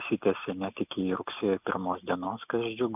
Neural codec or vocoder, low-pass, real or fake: none; 3.6 kHz; real